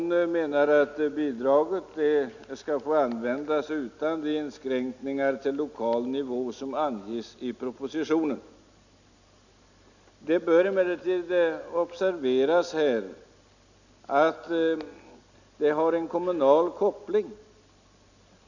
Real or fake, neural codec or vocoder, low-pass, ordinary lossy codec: real; none; 7.2 kHz; none